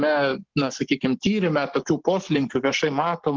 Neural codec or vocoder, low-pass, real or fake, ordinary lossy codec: codec, 44.1 kHz, 7.8 kbps, Pupu-Codec; 7.2 kHz; fake; Opus, 16 kbps